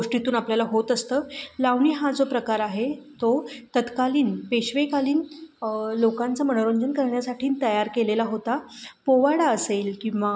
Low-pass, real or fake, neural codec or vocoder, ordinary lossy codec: none; real; none; none